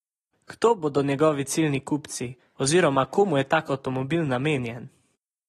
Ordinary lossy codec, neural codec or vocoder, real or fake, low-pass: AAC, 32 kbps; none; real; 19.8 kHz